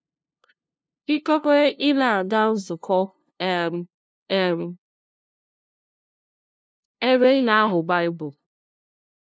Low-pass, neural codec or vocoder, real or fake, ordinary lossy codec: none; codec, 16 kHz, 0.5 kbps, FunCodec, trained on LibriTTS, 25 frames a second; fake; none